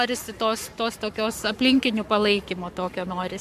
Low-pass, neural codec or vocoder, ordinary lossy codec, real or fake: 14.4 kHz; codec, 44.1 kHz, 7.8 kbps, Pupu-Codec; MP3, 96 kbps; fake